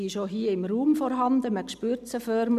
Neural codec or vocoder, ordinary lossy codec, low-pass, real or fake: none; none; 14.4 kHz; real